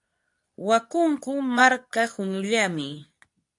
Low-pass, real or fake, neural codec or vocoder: 10.8 kHz; fake; codec, 24 kHz, 0.9 kbps, WavTokenizer, medium speech release version 2